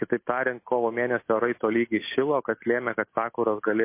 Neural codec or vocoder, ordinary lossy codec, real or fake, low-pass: none; MP3, 24 kbps; real; 3.6 kHz